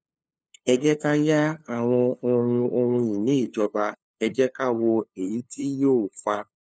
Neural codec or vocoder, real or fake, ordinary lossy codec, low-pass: codec, 16 kHz, 2 kbps, FunCodec, trained on LibriTTS, 25 frames a second; fake; none; none